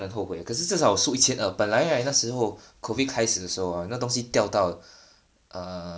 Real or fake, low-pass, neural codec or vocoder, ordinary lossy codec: real; none; none; none